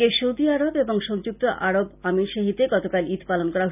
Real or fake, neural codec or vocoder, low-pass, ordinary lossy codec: real; none; 3.6 kHz; none